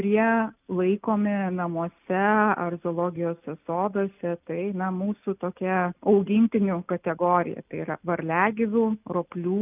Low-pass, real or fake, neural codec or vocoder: 3.6 kHz; real; none